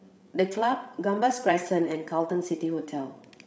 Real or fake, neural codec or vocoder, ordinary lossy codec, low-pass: fake; codec, 16 kHz, 8 kbps, FreqCodec, larger model; none; none